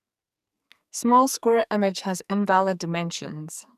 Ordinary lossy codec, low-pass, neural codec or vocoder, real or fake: none; 14.4 kHz; codec, 44.1 kHz, 2.6 kbps, SNAC; fake